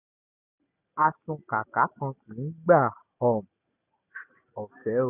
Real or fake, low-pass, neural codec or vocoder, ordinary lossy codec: real; 3.6 kHz; none; none